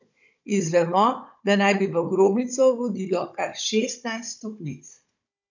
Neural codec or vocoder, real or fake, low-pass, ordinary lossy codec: codec, 16 kHz, 16 kbps, FunCodec, trained on Chinese and English, 50 frames a second; fake; 7.2 kHz; none